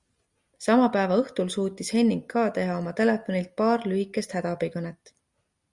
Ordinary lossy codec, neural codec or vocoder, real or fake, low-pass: Opus, 64 kbps; none; real; 10.8 kHz